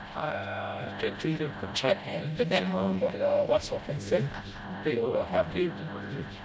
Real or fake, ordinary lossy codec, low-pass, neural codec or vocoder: fake; none; none; codec, 16 kHz, 0.5 kbps, FreqCodec, smaller model